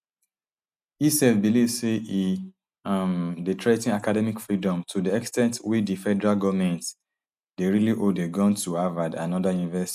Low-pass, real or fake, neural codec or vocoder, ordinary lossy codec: 14.4 kHz; real; none; none